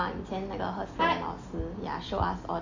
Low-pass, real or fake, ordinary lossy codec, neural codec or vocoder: 7.2 kHz; real; AAC, 48 kbps; none